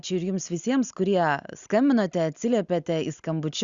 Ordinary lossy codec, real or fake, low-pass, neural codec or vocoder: Opus, 64 kbps; real; 7.2 kHz; none